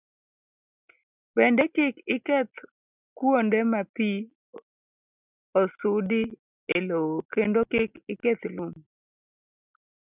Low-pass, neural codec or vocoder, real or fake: 3.6 kHz; none; real